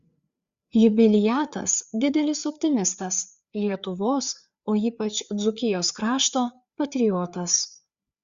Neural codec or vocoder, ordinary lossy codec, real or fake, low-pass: codec, 16 kHz, 4 kbps, FreqCodec, larger model; Opus, 64 kbps; fake; 7.2 kHz